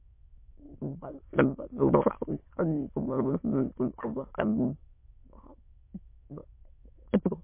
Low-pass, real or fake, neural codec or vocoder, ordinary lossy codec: 3.6 kHz; fake; autoencoder, 22.05 kHz, a latent of 192 numbers a frame, VITS, trained on many speakers; MP3, 24 kbps